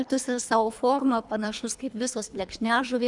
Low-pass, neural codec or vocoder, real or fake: 10.8 kHz; codec, 24 kHz, 3 kbps, HILCodec; fake